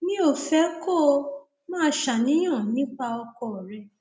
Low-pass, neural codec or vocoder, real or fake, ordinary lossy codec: none; none; real; none